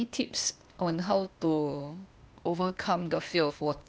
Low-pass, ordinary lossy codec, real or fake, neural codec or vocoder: none; none; fake; codec, 16 kHz, 0.8 kbps, ZipCodec